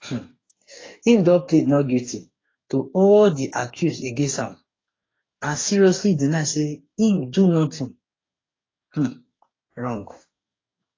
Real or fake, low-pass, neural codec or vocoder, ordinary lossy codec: fake; 7.2 kHz; codec, 44.1 kHz, 2.6 kbps, DAC; AAC, 32 kbps